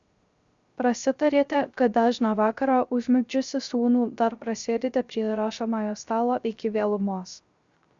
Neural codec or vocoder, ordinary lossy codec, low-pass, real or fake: codec, 16 kHz, 0.3 kbps, FocalCodec; Opus, 64 kbps; 7.2 kHz; fake